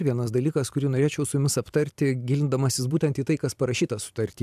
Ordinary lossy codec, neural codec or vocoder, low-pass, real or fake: AAC, 96 kbps; vocoder, 44.1 kHz, 128 mel bands every 256 samples, BigVGAN v2; 14.4 kHz; fake